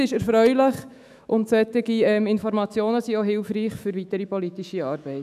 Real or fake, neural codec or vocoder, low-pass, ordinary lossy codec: fake; autoencoder, 48 kHz, 128 numbers a frame, DAC-VAE, trained on Japanese speech; 14.4 kHz; none